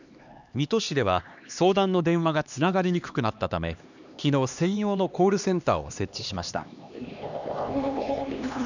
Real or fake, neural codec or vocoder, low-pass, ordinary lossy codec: fake; codec, 16 kHz, 2 kbps, X-Codec, HuBERT features, trained on LibriSpeech; 7.2 kHz; none